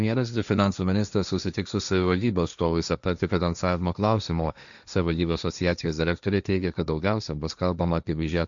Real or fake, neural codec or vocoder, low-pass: fake; codec, 16 kHz, 1.1 kbps, Voila-Tokenizer; 7.2 kHz